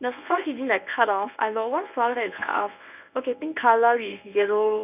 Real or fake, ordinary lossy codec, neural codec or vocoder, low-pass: fake; none; codec, 24 kHz, 0.9 kbps, WavTokenizer, medium speech release version 1; 3.6 kHz